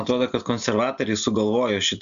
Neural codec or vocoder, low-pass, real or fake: none; 7.2 kHz; real